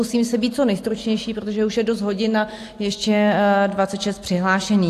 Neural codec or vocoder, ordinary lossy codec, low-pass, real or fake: none; AAC, 64 kbps; 14.4 kHz; real